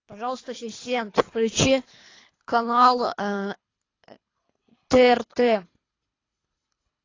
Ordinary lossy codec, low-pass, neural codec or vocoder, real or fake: AAC, 32 kbps; 7.2 kHz; codec, 24 kHz, 3 kbps, HILCodec; fake